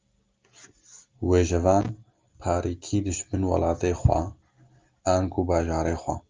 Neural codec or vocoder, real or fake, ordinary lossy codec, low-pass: none; real; Opus, 24 kbps; 7.2 kHz